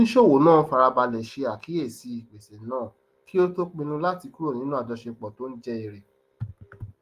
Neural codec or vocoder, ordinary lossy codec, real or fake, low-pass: none; Opus, 24 kbps; real; 14.4 kHz